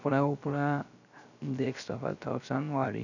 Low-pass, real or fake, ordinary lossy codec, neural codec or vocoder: 7.2 kHz; fake; none; codec, 16 kHz, 0.3 kbps, FocalCodec